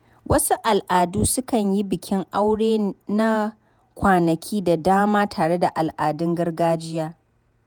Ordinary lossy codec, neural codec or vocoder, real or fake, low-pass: none; vocoder, 48 kHz, 128 mel bands, Vocos; fake; none